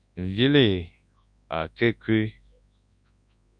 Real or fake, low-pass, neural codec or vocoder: fake; 9.9 kHz; codec, 24 kHz, 0.9 kbps, WavTokenizer, large speech release